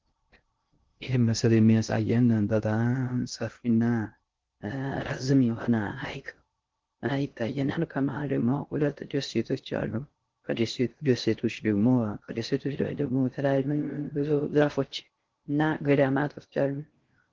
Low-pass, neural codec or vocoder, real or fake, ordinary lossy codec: 7.2 kHz; codec, 16 kHz in and 24 kHz out, 0.6 kbps, FocalCodec, streaming, 2048 codes; fake; Opus, 16 kbps